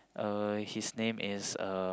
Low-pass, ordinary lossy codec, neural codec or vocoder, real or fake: none; none; none; real